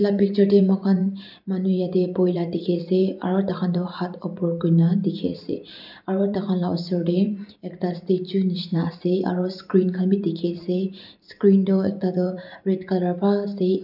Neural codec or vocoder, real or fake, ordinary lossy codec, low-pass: vocoder, 44.1 kHz, 128 mel bands every 512 samples, BigVGAN v2; fake; none; 5.4 kHz